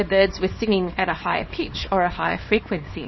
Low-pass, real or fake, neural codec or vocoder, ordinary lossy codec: 7.2 kHz; fake; codec, 24 kHz, 0.9 kbps, WavTokenizer, small release; MP3, 24 kbps